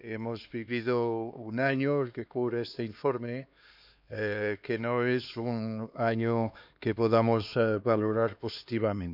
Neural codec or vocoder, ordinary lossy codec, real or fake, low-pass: codec, 16 kHz, 2 kbps, X-Codec, HuBERT features, trained on LibriSpeech; none; fake; 5.4 kHz